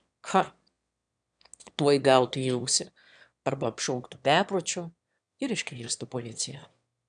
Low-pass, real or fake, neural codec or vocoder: 9.9 kHz; fake; autoencoder, 22.05 kHz, a latent of 192 numbers a frame, VITS, trained on one speaker